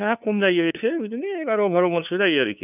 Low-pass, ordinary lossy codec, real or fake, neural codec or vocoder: 3.6 kHz; none; fake; codec, 16 kHz, 2 kbps, FunCodec, trained on LibriTTS, 25 frames a second